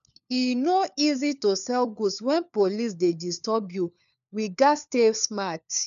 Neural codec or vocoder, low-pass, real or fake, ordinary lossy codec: codec, 16 kHz, 4 kbps, FunCodec, trained on LibriTTS, 50 frames a second; 7.2 kHz; fake; none